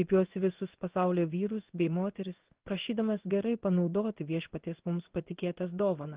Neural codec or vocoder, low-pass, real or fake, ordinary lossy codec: codec, 16 kHz in and 24 kHz out, 1 kbps, XY-Tokenizer; 3.6 kHz; fake; Opus, 16 kbps